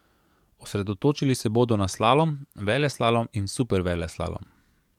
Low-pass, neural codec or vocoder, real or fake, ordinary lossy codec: 19.8 kHz; codec, 44.1 kHz, 7.8 kbps, DAC; fake; MP3, 96 kbps